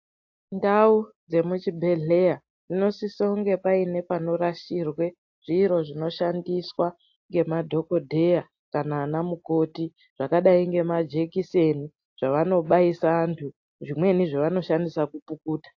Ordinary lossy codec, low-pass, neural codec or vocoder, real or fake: AAC, 48 kbps; 7.2 kHz; none; real